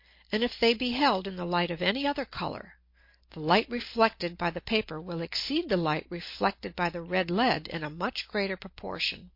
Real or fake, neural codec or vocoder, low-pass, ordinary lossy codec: real; none; 5.4 kHz; MP3, 32 kbps